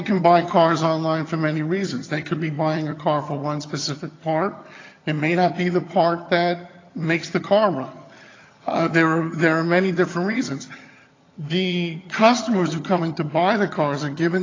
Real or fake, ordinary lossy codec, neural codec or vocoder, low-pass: fake; AAC, 32 kbps; vocoder, 22.05 kHz, 80 mel bands, HiFi-GAN; 7.2 kHz